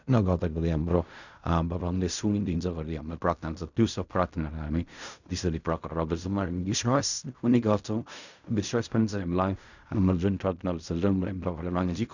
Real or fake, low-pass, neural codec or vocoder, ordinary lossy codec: fake; 7.2 kHz; codec, 16 kHz in and 24 kHz out, 0.4 kbps, LongCat-Audio-Codec, fine tuned four codebook decoder; none